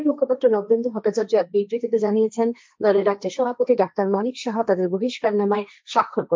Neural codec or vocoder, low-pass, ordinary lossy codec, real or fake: codec, 16 kHz, 1.1 kbps, Voila-Tokenizer; none; none; fake